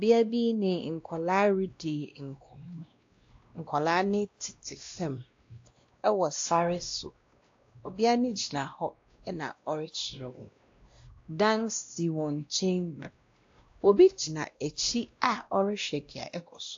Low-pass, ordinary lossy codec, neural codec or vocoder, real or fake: 7.2 kHz; AAC, 48 kbps; codec, 16 kHz, 1 kbps, X-Codec, WavLM features, trained on Multilingual LibriSpeech; fake